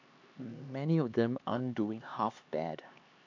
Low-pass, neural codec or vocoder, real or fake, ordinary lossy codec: 7.2 kHz; codec, 16 kHz, 2 kbps, X-Codec, HuBERT features, trained on LibriSpeech; fake; AAC, 48 kbps